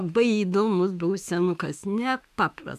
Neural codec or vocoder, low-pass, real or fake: autoencoder, 48 kHz, 32 numbers a frame, DAC-VAE, trained on Japanese speech; 14.4 kHz; fake